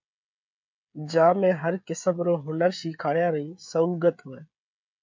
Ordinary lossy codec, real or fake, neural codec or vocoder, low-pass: MP3, 48 kbps; fake; codec, 16 kHz, 16 kbps, FreqCodec, smaller model; 7.2 kHz